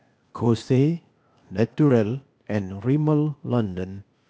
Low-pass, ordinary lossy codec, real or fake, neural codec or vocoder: none; none; fake; codec, 16 kHz, 0.8 kbps, ZipCodec